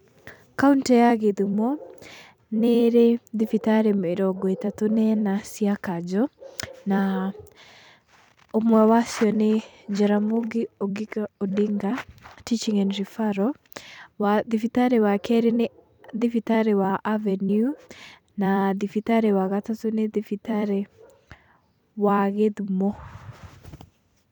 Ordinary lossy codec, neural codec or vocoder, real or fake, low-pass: none; vocoder, 44.1 kHz, 128 mel bands every 256 samples, BigVGAN v2; fake; 19.8 kHz